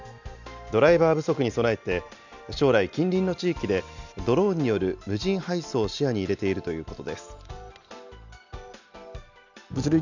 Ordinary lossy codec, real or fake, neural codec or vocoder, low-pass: none; real; none; 7.2 kHz